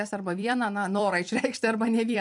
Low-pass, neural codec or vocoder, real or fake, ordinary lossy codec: 10.8 kHz; vocoder, 44.1 kHz, 128 mel bands every 256 samples, BigVGAN v2; fake; MP3, 64 kbps